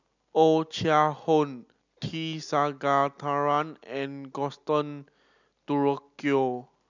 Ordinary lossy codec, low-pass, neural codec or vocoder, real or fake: none; 7.2 kHz; none; real